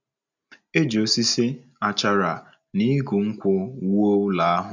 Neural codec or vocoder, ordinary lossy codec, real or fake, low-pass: none; none; real; 7.2 kHz